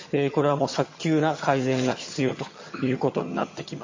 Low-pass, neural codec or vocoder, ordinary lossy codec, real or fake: 7.2 kHz; vocoder, 22.05 kHz, 80 mel bands, HiFi-GAN; MP3, 32 kbps; fake